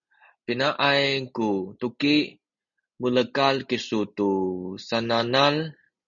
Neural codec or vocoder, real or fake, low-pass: none; real; 7.2 kHz